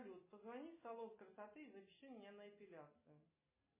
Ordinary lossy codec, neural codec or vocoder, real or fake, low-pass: MP3, 16 kbps; none; real; 3.6 kHz